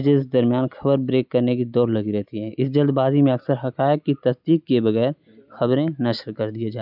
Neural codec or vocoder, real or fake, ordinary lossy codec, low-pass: none; real; none; 5.4 kHz